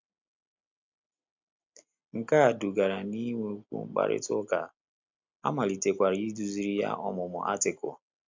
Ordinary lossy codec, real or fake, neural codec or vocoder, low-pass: MP3, 64 kbps; real; none; 7.2 kHz